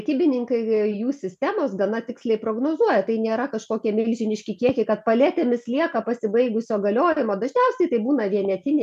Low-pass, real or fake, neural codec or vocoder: 14.4 kHz; real; none